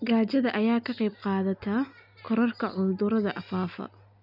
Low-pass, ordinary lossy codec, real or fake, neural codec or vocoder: 5.4 kHz; none; real; none